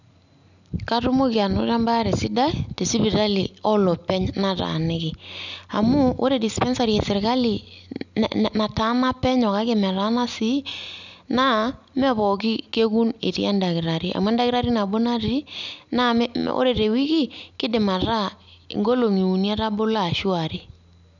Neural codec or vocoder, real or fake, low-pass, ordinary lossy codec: none; real; 7.2 kHz; none